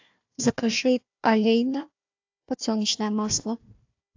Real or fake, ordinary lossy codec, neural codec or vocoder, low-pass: fake; AAC, 48 kbps; codec, 16 kHz, 1 kbps, FunCodec, trained on Chinese and English, 50 frames a second; 7.2 kHz